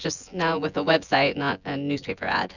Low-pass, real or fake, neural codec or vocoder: 7.2 kHz; fake; vocoder, 24 kHz, 100 mel bands, Vocos